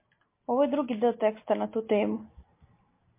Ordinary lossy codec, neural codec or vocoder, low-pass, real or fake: MP3, 24 kbps; none; 3.6 kHz; real